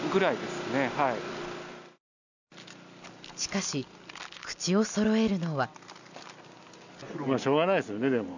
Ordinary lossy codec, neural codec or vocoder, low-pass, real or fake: none; none; 7.2 kHz; real